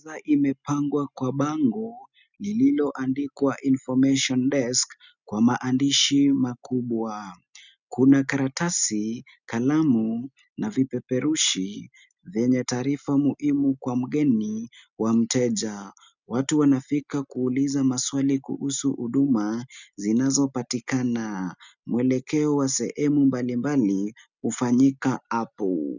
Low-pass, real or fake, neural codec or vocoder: 7.2 kHz; real; none